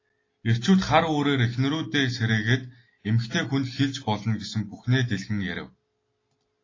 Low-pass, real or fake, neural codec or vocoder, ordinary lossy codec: 7.2 kHz; real; none; AAC, 32 kbps